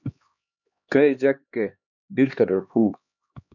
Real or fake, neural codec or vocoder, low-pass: fake; codec, 16 kHz, 2 kbps, X-Codec, HuBERT features, trained on LibriSpeech; 7.2 kHz